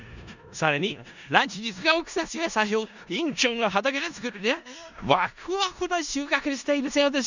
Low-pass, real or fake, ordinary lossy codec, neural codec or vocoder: 7.2 kHz; fake; none; codec, 16 kHz in and 24 kHz out, 0.4 kbps, LongCat-Audio-Codec, four codebook decoder